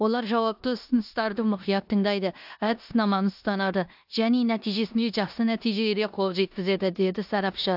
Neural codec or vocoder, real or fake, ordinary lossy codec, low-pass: codec, 16 kHz in and 24 kHz out, 0.9 kbps, LongCat-Audio-Codec, four codebook decoder; fake; AAC, 48 kbps; 5.4 kHz